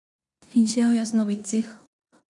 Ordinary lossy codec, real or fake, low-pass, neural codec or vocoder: none; fake; 10.8 kHz; codec, 16 kHz in and 24 kHz out, 0.9 kbps, LongCat-Audio-Codec, four codebook decoder